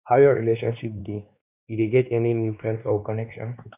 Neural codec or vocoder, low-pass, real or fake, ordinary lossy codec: codec, 16 kHz, 2 kbps, X-Codec, WavLM features, trained on Multilingual LibriSpeech; 3.6 kHz; fake; none